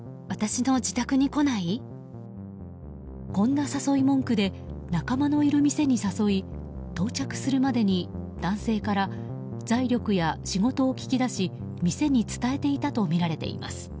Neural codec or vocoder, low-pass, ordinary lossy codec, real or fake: none; none; none; real